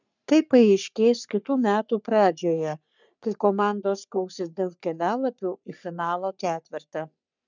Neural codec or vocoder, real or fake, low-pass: codec, 44.1 kHz, 3.4 kbps, Pupu-Codec; fake; 7.2 kHz